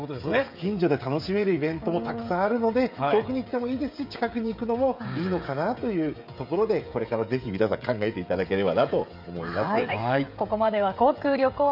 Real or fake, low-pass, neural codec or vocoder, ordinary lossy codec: fake; 5.4 kHz; codec, 16 kHz, 16 kbps, FreqCodec, smaller model; none